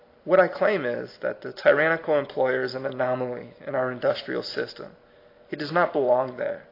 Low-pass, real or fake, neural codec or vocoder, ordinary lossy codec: 5.4 kHz; real; none; AAC, 32 kbps